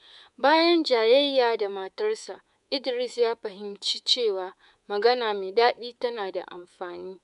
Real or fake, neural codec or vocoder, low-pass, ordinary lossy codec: fake; codec, 24 kHz, 3.1 kbps, DualCodec; 10.8 kHz; none